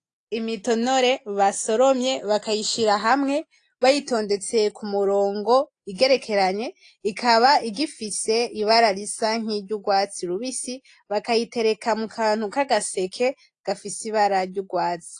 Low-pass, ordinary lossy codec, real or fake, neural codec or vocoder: 9.9 kHz; AAC, 48 kbps; real; none